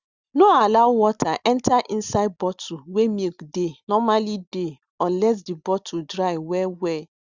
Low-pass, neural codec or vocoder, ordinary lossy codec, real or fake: 7.2 kHz; none; Opus, 64 kbps; real